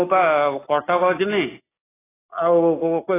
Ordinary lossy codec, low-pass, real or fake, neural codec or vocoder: AAC, 16 kbps; 3.6 kHz; real; none